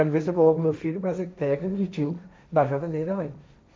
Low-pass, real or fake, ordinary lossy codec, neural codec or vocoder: none; fake; none; codec, 16 kHz, 1.1 kbps, Voila-Tokenizer